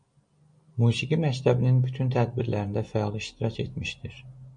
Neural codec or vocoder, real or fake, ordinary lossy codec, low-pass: none; real; MP3, 48 kbps; 9.9 kHz